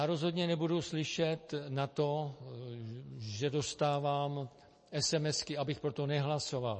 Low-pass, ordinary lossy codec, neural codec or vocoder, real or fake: 10.8 kHz; MP3, 32 kbps; none; real